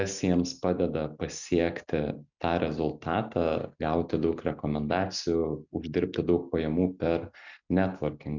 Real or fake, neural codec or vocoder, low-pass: real; none; 7.2 kHz